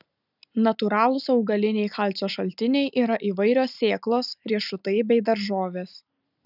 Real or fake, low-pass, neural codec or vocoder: real; 5.4 kHz; none